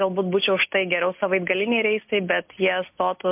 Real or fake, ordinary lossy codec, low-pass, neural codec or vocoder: real; MP3, 32 kbps; 3.6 kHz; none